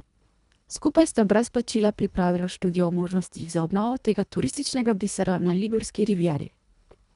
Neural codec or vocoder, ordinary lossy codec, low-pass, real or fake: codec, 24 kHz, 1.5 kbps, HILCodec; none; 10.8 kHz; fake